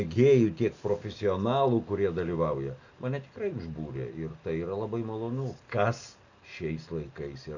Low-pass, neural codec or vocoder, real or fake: 7.2 kHz; none; real